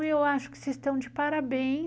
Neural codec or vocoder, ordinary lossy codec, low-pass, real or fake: none; none; none; real